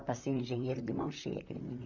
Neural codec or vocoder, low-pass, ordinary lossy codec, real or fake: vocoder, 44.1 kHz, 128 mel bands, Pupu-Vocoder; 7.2 kHz; none; fake